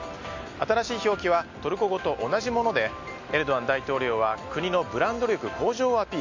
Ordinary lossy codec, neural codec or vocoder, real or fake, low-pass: MP3, 48 kbps; none; real; 7.2 kHz